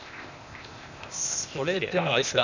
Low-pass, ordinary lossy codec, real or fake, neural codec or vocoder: 7.2 kHz; none; fake; codec, 16 kHz, 0.8 kbps, ZipCodec